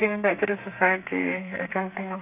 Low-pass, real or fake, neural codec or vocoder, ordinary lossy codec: 3.6 kHz; fake; codec, 32 kHz, 1.9 kbps, SNAC; none